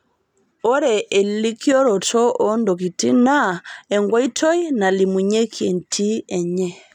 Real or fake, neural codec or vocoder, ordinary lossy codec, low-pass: real; none; none; 19.8 kHz